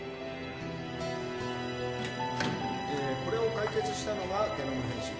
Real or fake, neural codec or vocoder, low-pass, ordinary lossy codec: real; none; none; none